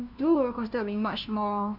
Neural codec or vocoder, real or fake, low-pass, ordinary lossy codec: codec, 16 kHz, 1 kbps, FunCodec, trained on LibriTTS, 50 frames a second; fake; 5.4 kHz; none